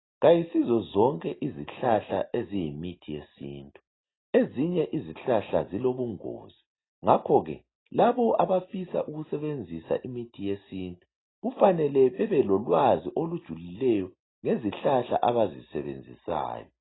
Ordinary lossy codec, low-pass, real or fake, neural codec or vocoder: AAC, 16 kbps; 7.2 kHz; real; none